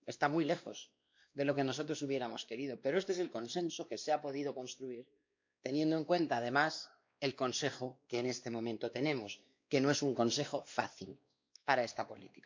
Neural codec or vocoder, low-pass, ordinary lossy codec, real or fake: codec, 16 kHz, 2 kbps, X-Codec, WavLM features, trained on Multilingual LibriSpeech; 7.2 kHz; MP3, 64 kbps; fake